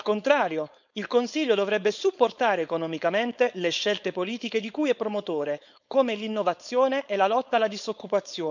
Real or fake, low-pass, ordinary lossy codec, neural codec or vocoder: fake; 7.2 kHz; none; codec, 16 kHz, 4.8 kbps, FACodec